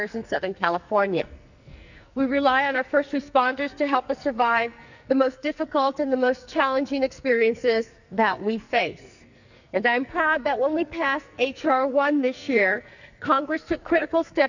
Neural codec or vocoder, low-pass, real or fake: codec, 44.1 kHz, 2.6 kbps, SNAC; 7.2 kHz; fake